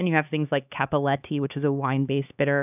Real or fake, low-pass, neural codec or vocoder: fake; 3.6 kHz; codec, 16 kHz, 2 kbps, X-Codec, HuBERT features, trained on LibriSpeech